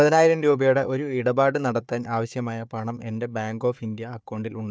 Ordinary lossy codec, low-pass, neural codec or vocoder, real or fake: none; none; codec, 16 kHz, 4 kbps, FunCodec, trained on Chinese and English, 50 frames a second; fake